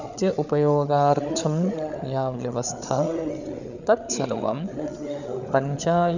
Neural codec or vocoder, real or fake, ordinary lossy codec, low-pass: codec, 16 kHz, 8 kbps, FreqCodec, larger model; fake; none; 7.2 kHz